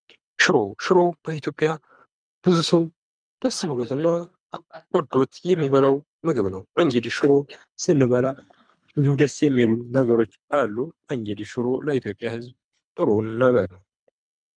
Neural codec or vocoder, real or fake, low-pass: codec, 24 kHz, 3 kbps, HILCodec; fake; 9.9 kHz